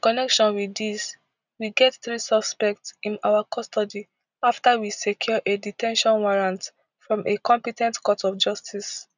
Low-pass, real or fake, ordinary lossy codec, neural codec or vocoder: 7.2 kHz; real; none; none